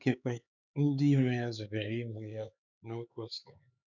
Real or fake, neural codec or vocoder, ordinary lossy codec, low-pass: fake; codec, 16 kHz, 4 kbps, X-Codec, HuBERT features, trained on LibriSpeech; none; 7.2 kHz